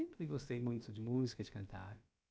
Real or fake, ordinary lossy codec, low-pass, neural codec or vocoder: fake; none; none; codec, 16 kHz, about 1 kbps, DyCAST, with the encoder's durations